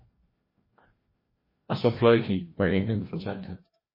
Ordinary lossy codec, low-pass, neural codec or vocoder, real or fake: MP3, 24 kbps; 5.4 kHz; codec, 16 kHz, 1 kbps, FreqCodec, larger model; fake